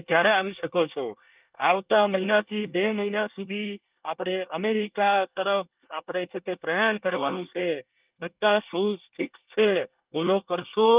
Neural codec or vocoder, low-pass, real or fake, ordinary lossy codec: codec, 24 kHz, 1 kbps, SNAC; 3.6 kHz; fake; Opus, 24 kbps